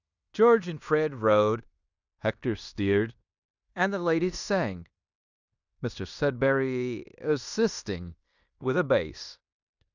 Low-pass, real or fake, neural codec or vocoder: 7.2 kHz; fake; codec, 16 kHz in and 24 kHz out, 0.9 kbps, LongCat-Audio-Codec, fine tuned four codebook decoder